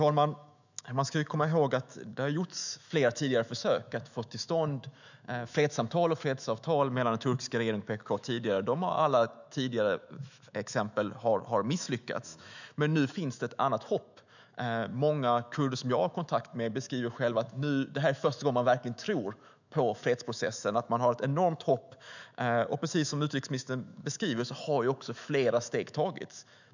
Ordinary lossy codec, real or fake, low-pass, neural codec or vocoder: none; fake; 7.2 kHz; autoencoder, 48 kHz, 128 numbers a frame, DAC-VAE, trained on Japanese speech